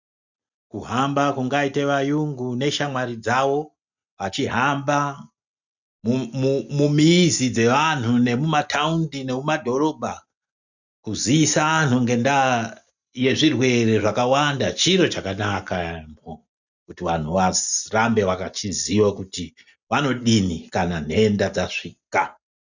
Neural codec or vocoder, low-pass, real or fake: none; 7.2 kHz; real